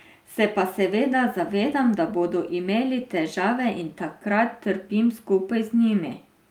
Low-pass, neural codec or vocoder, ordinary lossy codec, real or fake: 19.8 kHz; none; Opus, 32 kbps; real